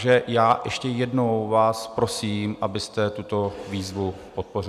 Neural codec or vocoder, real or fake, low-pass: none; real; 14.4 kHz